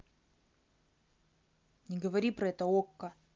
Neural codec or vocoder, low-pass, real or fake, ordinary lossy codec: none; 7.2 kHz; real; Opus, 32 kbps